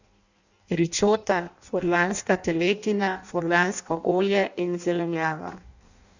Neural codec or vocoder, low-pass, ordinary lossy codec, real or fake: codec, 16 kHz in and 24 kHz out, 0.6 kbps, FireRedTTS-2 codec; 7.2 kHz; none; fake